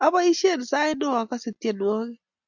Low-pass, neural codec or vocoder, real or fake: 7.2 kHz; none; real